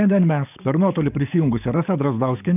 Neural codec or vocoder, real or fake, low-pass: codec, 44.1 kHz, 7.8 kbps, DAC; fake; 3.6 kHz